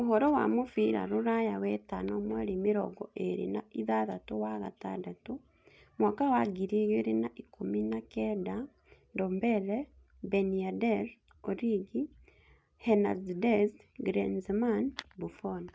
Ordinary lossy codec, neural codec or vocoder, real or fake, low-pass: none; none; real; none